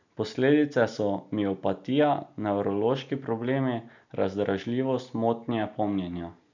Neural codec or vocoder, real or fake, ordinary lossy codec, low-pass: none; real; none; 7.2 kHz